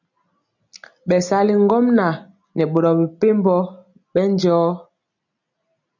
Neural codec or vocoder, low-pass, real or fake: none; 7.2 kHz; real